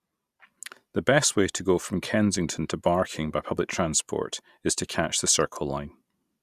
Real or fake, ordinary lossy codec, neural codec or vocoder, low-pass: real; Opus, 64 kbps; none; 14.4 kHz